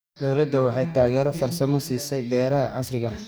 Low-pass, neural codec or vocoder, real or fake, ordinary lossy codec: none; codec, 44.1 kHz, 2.6 kbps, DAC; fake; none